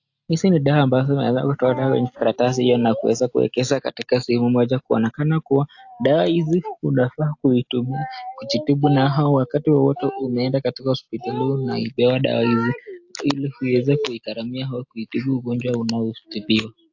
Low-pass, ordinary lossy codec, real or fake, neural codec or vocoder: 7.2 kHz; AAC, 48 kbps; real; none